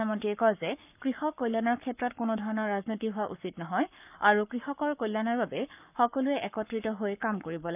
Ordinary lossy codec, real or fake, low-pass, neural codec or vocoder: none; fake; 3.6 kHz; codec, 44.1 kHz, 7.8 kbps, Pupu-Codec